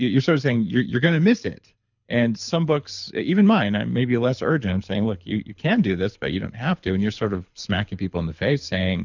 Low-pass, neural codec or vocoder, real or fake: 7.2 kHz; codec, 24 kHz, 6 kbps, HILCodec; fake